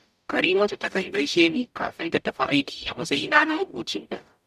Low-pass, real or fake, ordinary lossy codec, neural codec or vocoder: 14.4 kHz; fake; none; codec, 44.1 kHz, 0.9 kbps, DAC